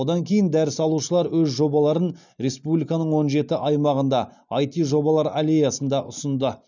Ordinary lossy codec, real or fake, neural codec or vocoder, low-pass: none; real; none; 7.2 kHz